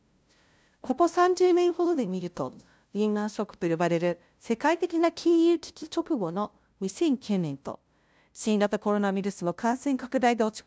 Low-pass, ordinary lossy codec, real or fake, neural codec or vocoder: none; none; fake; codec, 16 kHz, 0.5 kbps, FunCodec, trained on LibriTTS, 25 frames a second